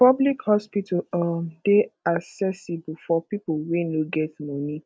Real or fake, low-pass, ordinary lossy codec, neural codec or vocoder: real; none; none; none